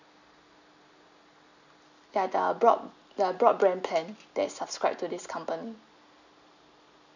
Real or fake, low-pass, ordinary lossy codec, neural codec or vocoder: real; 7.2 kHz; none; none